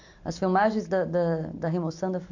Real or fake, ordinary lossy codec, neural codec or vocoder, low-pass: real; AAC, 48 kbps; none; 7.2 kHz